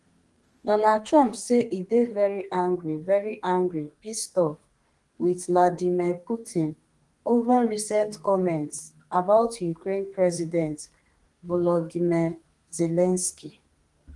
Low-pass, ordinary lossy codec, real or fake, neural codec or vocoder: 10.8 kHz; Opus, 24 kbps; fake; codec, 32 kHz, 1.9 kbps, SNAC